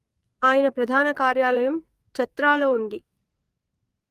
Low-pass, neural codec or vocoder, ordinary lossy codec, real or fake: 14.4 kHz; codec, 44.1 kHz, 2.6 kbps, SNAC; Opus, 32 kbps; fake